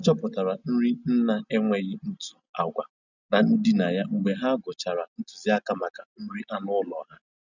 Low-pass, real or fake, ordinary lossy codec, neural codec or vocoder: 7.2 kHz; real; none; none